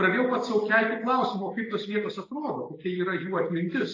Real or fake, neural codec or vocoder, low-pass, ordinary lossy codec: real; none; 7.2 kHz; AAC, 32 kbps